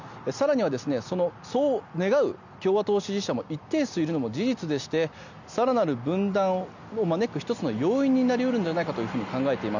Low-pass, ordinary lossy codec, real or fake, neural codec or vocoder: 7.2 kHz; none; real; none